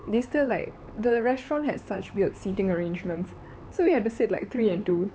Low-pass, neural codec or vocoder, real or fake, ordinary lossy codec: none; codec, 16 kHz, 4 kbps, X-Codec, HuBERT features, trained on LibriSpeech; fake; none